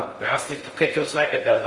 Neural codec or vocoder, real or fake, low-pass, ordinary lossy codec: codec, 16 kHz in and 24 kHz out, 0.6 kbps, FocalCodec, streaming, 4096 codes; fake; 10.8 kHz; Opus, 24 kbps